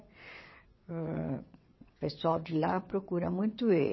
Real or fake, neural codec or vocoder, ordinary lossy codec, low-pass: fake; vocoder, 22.05 kHz, 80 mel bands, WaveNeXt; MP3, 24 kbps; 7.2 kHz